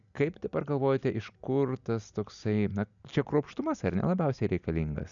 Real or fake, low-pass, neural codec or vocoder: real; 7.2 kHz; none